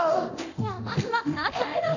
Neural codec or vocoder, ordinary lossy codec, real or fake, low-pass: codec, 24 kHz, 0.9 kbps, DualCodec; none; fake; 7.2 kHz